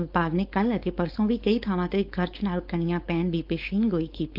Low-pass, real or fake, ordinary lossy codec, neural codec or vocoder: 5.4 kHz; fake; Opus, 24 kbps; codec, 16 kHz, 4.8 kbps, FACodec